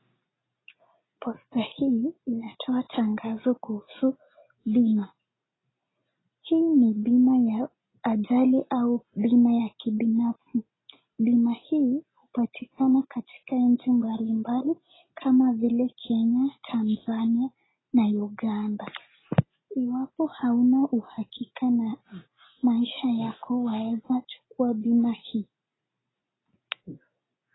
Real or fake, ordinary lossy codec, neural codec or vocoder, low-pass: real; AAC, 16 kbps; none; 7.2 kHz